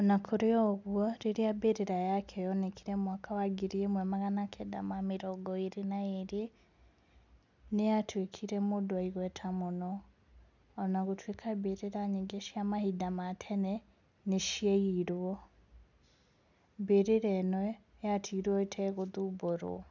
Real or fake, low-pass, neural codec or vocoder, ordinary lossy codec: real; 7.2 kHz; none; none